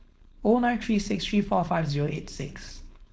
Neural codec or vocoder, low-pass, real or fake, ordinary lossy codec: codec, 16 kHz, 4.8 kbps, FACodec; none; fake; none